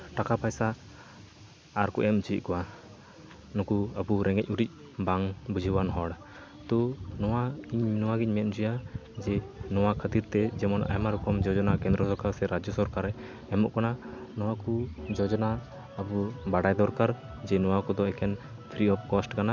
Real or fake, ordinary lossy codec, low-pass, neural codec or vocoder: real; none; none; none